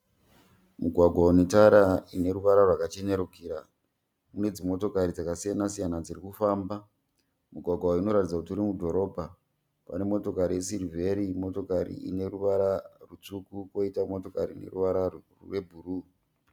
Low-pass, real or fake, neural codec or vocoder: 19.8 kHz; real; none